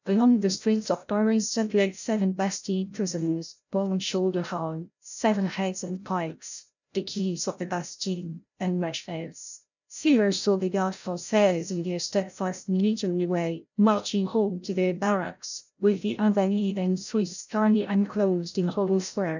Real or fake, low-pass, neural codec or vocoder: fake; 7.2 kHz; codec, 16 kHz, 0.5 kbps, FreqCodec, larger model